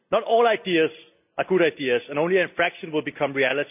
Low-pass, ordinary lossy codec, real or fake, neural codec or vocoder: 3.6 kHz; AAC, 32 kbps; real; none